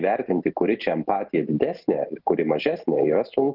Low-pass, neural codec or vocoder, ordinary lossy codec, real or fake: 5.4 kHz; none; Opus, 16 kbps; real